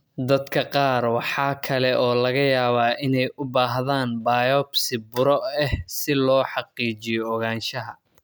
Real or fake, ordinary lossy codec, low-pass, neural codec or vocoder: real; none; none; none